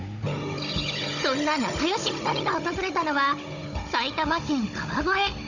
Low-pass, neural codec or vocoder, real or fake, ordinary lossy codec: 7.2 kHz; codec, 16 kHz, 16 kbps, FunCodec, trained on Chinese and English, 50 frames a second; fake; none